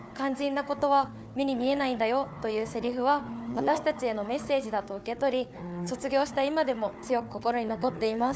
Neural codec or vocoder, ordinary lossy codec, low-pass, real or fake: codec, 16 kHz, 4 kbps, FunCodec, trained on Chinese and English, 50 frames a second; none; none; fake